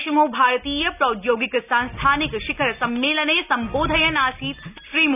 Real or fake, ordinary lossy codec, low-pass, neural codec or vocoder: real; none; 3.6 kHz; none